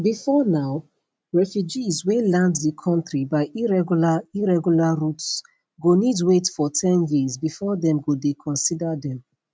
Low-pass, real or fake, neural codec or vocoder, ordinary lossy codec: none; real; none; none